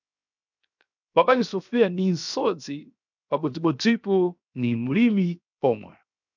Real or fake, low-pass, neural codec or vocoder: fake; 7.2 kHz; codec, 16 kHz, 0.7 kbps, FocalCodec